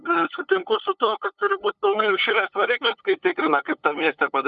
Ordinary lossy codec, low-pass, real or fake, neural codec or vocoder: Opus, 64 kbps; 7.2 kHz; fake; codec, 16 kHz, 8 kbps, FunCodec, trained on LibriTTS, 25 frames a second